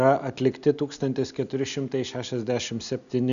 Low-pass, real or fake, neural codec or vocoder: 7.2 kHz; real; none